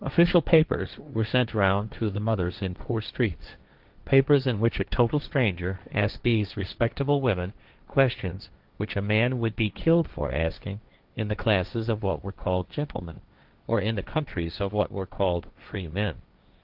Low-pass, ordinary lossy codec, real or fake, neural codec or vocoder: 5.4 kHz; Opus, 32 kbps; fake; codec, 16 kHz, 1.1 kbps, Voila-Tokenizer